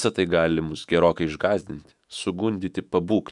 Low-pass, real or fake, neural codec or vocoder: 10.8 kHz; fake; autoencoder, 48 kHz, 128 numbers a frame, DAC-VAE, trained on Japanese speech